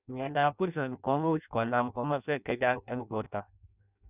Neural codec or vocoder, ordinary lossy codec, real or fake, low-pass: codec, 16 kHz in and 24 kHz out, 0.6 kbps, FireRedTTS-2 codec; none; fake; 3.6 kHz